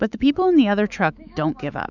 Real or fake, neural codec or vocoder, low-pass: fake; autoencoder, 48 kHz, 128 numbers a frame, DAC-VAE, trained on Japanese speech; 7.2 kHz